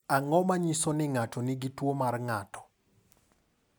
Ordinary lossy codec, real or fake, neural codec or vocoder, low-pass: none; real; none; none